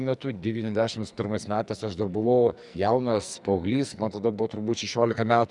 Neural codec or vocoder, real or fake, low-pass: codec, 44.1 kHz, 2.6 kbps, SNAC; fake; 10.8 kHz